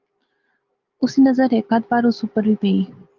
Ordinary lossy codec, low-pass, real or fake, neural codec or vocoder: Opus, 24 kbps; 7.2 kHz; real; none